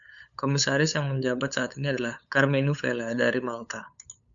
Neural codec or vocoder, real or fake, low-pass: codec, 16 kHz, 8 kbps, FunCodec, trained on LibriTTS, 25 frames a second; fake; 7.2 kHz